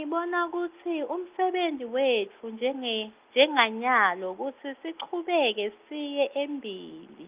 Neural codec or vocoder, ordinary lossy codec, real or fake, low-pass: none; Opus, 32 kbps; real; 3.6 kHz